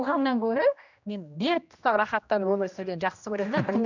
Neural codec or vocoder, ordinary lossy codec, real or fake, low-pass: codec, 16 kHz, 1 kbps, X-Codec, HuBERT features, trained on general audio; none; fake; 7.2 kHz